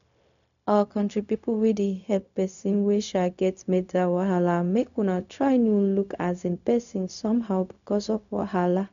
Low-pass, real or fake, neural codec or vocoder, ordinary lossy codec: 7.2 kHz; fake; codec, 16 kHz, 0.4 kbps, LongCat-Audio-Codec; none